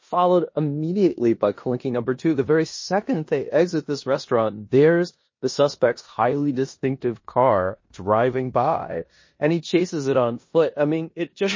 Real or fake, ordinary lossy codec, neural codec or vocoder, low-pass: fake; MP3, 32 kbps; codec, 16 kHz in and 24 kHz out, 0.9 kbps, LongCat-Audio-Codec, four codebook decoder; 7.2 kHz